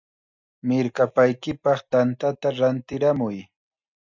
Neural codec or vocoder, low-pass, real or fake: none; 7.2 kHz; real